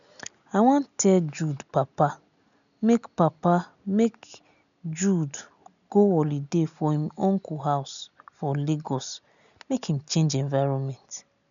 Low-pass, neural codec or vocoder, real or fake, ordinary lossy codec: 7.2 kHz; none; real; none